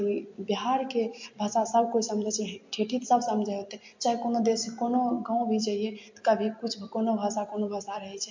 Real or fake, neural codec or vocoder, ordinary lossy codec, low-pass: real; none; MP3, 48 kbps; 7.2 kHz